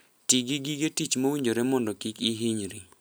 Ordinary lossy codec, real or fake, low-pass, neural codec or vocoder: none; real; none; none